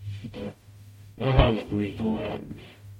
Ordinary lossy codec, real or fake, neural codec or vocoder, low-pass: MP3, 64 kbps; fake; codec, 44.1 kHz, 0.9 kbps, DAC; 19.8 kHz